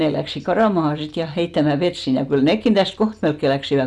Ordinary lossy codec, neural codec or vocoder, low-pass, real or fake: none; none; none; real